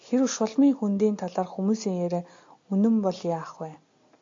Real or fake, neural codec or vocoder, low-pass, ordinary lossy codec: real; none; 7.2 kHz; AAC, 48 kbps